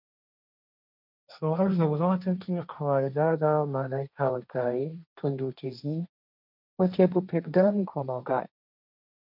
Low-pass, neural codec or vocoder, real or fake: 5.4 kHz; codec, 16 kHz, 1.1 kbps, Voila-Tokenizer; fake